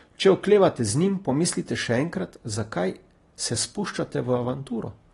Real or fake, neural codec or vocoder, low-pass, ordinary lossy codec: real; none; 10.8 kHz; AAC, 32 kbps